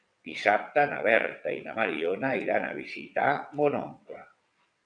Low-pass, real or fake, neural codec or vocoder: 9.9 kHz; fake; vocoder, 22.05 kHz, 80 mel bands, WaveNeXt